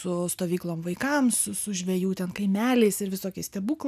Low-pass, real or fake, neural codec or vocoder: 14.4 kHz; real; none